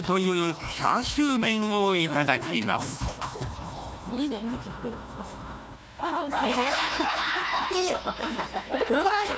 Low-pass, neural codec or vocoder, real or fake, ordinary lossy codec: none; codec, 16 kHz, 1 kbps, FunCodec, trained on Chinese and English, 50 frames a second; fake; none